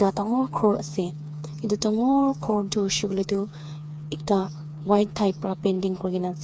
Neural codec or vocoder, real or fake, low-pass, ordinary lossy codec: codec, 16 kHz, 4 kbps, FreqCodec, smaller model; fake; none; none